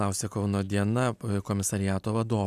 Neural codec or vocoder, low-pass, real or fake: none; 14.4 kHz; real